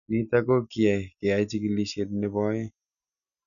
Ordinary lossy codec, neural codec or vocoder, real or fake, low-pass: none; none; real; 7.2 kHz